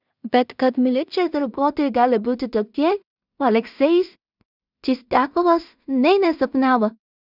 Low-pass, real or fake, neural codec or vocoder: 5.4 kHz; fake; codec, 16 kHz in and 24 kHz out, 0.4 kbps, LongCat-Audio-Codec, two codebook decoder